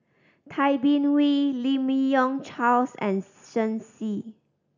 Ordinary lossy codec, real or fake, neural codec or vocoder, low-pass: none; real; none; 7.2 kHz